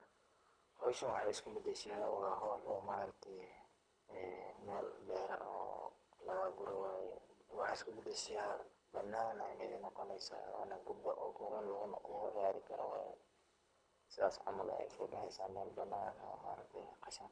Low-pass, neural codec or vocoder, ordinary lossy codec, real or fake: 9.9 kHz; codec, 24 kHz, 3 kbps, HILCodec; none; fake